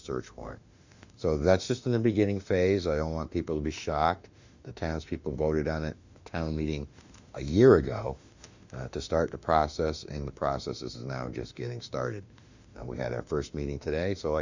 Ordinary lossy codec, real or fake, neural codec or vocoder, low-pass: Opus, 64 kbps; fake; autoencoder, 48 kHz, 32 numbers a frame, DAC-VAE, trained on Japanese speech; 7.2 kHz